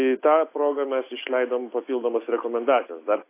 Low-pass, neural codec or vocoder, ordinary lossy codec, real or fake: 3.6 kHz; none; AAC, 24 kbps; real